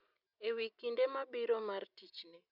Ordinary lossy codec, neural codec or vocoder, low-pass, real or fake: none; none; 5.4 kHz; real